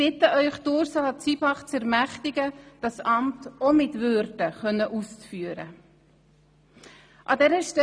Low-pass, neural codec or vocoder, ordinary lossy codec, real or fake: none; none; none; real